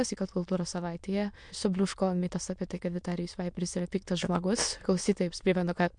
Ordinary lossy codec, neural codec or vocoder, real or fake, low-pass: MP3, 64 kbps; autoencoder, 22.05 kHz, a latent of 192 numbers a frame, VITS, trained on many speakers; fake; 9.9 kHz